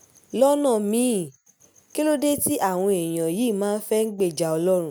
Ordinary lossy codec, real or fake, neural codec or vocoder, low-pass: none; real; none; none